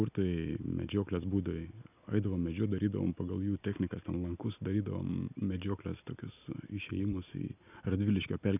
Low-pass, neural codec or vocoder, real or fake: 3.6 kHz; none; real